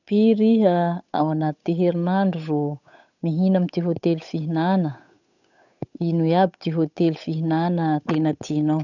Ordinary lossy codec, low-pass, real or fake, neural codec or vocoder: none; 7.2 kHz; fake; codec, 16 kHz, 8 kbps, FunCodec, trained on Chinese and English, 25 frames a second